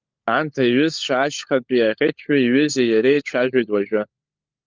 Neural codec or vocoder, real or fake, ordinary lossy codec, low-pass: codec, 16 kHz, 16 kbps, FunCodec, trained on LibriTTS, 50 frames a second; fake; Opus, 24 kbps; 7.2 kHz